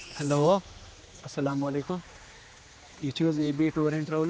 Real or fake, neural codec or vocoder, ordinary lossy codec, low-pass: fake; codec, 16 kHz, 2 kbps, X-Codec, HuBERT features, trained on general audio; none; none